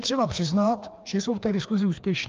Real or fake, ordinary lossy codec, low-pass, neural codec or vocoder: fake; Opus, 24 kbps; 7.2 kHz; codec, 16 kHz, 2 kbps, FreqCodec, larger model